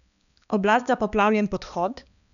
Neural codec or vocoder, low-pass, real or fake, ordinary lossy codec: codec, 16 kHz, 2 kbps, X-Codec, HuBERT features, trained on balanced general audio; 7.2 kHz; fake; none